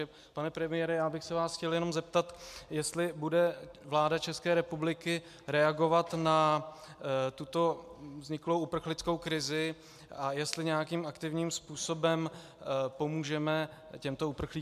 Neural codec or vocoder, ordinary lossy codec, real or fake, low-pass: none; MP3, 96 kbps; real; 14.4 kHz